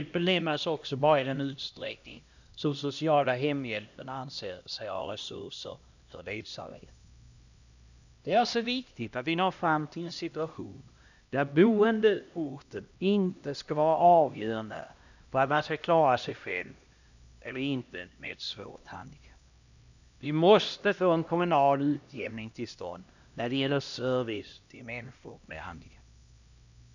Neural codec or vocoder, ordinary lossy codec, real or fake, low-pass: codec, 16 kHz, 1 kbps, X-Codec, HuBERT features, trained on LibriSpeech; none; fake; 7.2 kHz